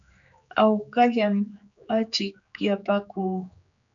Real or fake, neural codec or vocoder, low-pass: fake; codec, 16 kHz, 4 kbps, X-Codec, HuBERT features, trained on general audio; 7.2 kHz